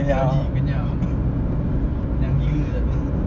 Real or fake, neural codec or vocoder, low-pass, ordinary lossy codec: real; none; 7.2 kHz; Opus, 64 kbps